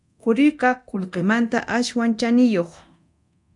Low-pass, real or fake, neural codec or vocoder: 10.8 kHz; fake; codec, 24 kHz, 0.9 kbps, DualCodec